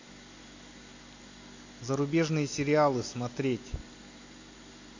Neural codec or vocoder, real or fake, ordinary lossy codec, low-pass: none; real; AAC, 48 kbps; 7.2 kHz